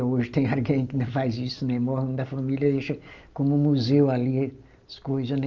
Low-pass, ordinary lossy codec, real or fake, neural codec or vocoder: 7.2 kHz; Opus, 32 kbps; real; none